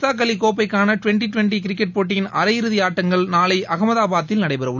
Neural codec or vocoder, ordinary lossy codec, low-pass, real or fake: none; none; 7.2 kHz; real